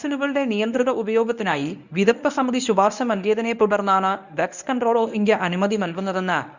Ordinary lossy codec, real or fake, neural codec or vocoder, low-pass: none; fake; codec, 24 kHz, 0.9 kbps, WavTokenizer, medium speech release version 1; 7.2 kHz